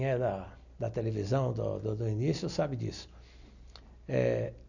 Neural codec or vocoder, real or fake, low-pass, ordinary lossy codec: none; real; 7.2 kHz; none